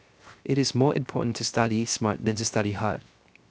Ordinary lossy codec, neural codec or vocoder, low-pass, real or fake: none; codec, 16 kHz, 0.7 kbps, FocalCodec; none; fake